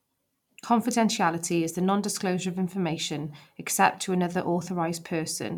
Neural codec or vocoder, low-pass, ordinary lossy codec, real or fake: vocoder, 44.1 kHz, 128 mel bands every 512 samples, BigVGAN v2; 19.8 kHz; none; fake